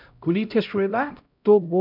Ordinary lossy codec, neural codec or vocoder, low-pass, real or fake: none; codec, 16 kHz, 0.5 kbps, X-Codec, HuBERT features, trained on LibriSpeech; 5.4 kHz; fake